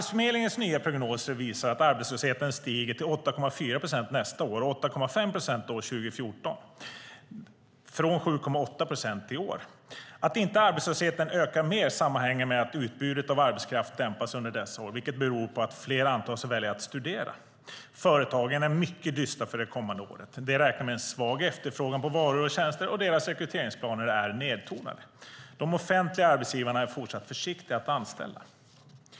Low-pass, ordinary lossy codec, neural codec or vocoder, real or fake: none; none; none; real